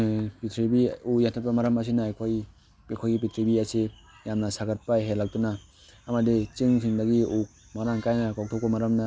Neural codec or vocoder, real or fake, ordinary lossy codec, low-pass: none; real; none; none